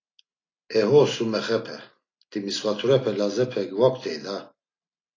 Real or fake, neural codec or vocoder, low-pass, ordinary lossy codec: real; none; 7.2 kHz; AAC, 32 kbps